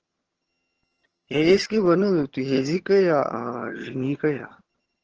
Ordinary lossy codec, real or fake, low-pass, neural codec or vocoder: Opus, 16 kbps; fake; 7.2 kHz; vocoder, 22.05 kHz, 80 mel bands, HiFi-GAN